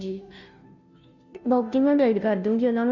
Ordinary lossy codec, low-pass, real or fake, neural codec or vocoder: none; 7.2 kHz; fake; codec, 16 kHz, 0.5 kbps, FunCodec, trained on Chinese and English, 25 frames a second